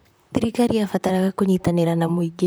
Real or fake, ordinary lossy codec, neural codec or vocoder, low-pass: fake; none; vocoder, 44.1 kHz, 128 mel bands, Pupu-Vocoder; none